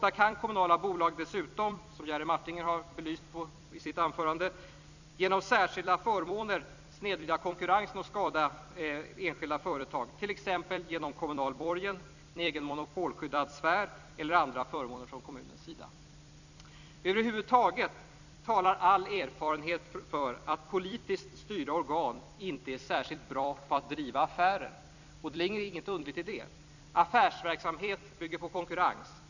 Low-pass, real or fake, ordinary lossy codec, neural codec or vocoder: 7.2 kHz; fake; none; vocoder, 44.1 kHz, 128 mel bands every 256 samples, BigVGAN v2